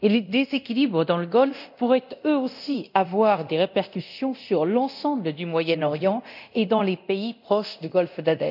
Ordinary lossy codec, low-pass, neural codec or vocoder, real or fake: none; 5.4 kHz; codec, 24 kHz, 0.9 kbps, DualCodec; fake